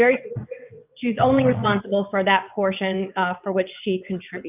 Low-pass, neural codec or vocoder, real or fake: 3.6 kHz; vocoder, 22.05 kHz, 80 mel bands, WaveNeXt; fake